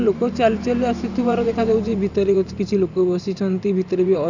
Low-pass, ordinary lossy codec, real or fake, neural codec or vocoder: 7.2 kHz; none; fake; vocoder, 44.1 kHz, 128 mel bands every 512 samples, BigVGAN v2